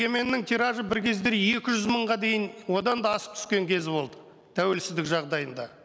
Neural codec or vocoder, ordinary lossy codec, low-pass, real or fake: none; none; none; real